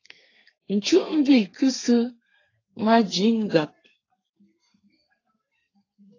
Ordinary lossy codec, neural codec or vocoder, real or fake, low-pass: AAC, 32 kbps; codec, 32 kHz, 1.9 kbps, SNAC; fake; 7.2 kHz